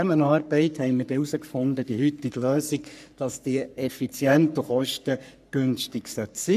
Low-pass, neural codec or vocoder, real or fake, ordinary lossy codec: 14.4 kHz; codec, 44.1 kHz, 3.4 kbps, Pupu-Codec; fake; none